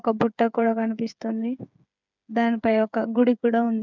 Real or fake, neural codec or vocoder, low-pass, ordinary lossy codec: fake; codec, 16 kHz, 8 kbps, FreqCodec, smaller model; 7.2 kHz; none